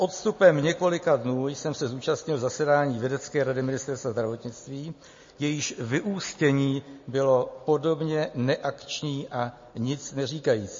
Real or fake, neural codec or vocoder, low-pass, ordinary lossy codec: real; none; 7.2 kHz; MP3, 32 kbps